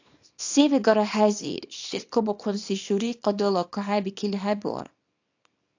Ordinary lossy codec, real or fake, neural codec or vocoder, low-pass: AAC, 48 kbps; fake; codec, 24 kHz, 0.9 kbps, WavTokenizer, small release; 7.2 kHz